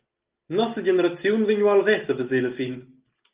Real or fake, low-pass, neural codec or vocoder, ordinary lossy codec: real; 3.6 kHz; none; Opus, 32 kbps